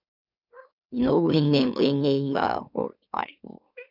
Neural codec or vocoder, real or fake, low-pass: autoencoder, 44.1 kHz, a latent of 192 numbers a frame, MeloTTS; fake; 5.4 kHz